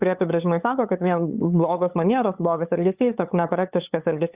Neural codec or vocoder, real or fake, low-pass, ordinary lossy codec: codec, 16 kHz, 8 kbps, FunCodec, trained on LibriTTS, 25 frames a second; fake; 3.6 kHz; Opus, 64 kbps